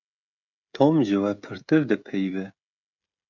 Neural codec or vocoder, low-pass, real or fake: codec, 16 kHz, 16 kbps, FreqCodec, smaller model; 7.2 kHz; fake